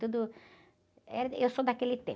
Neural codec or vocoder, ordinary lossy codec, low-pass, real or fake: none; none; none; real